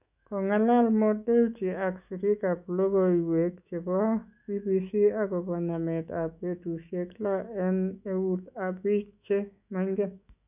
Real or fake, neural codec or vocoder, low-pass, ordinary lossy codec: fake; autoencoder, 48 kHz, 128 numbers a frame, DAC-VAE, trained on Japanese speech; 3.6 kHz; none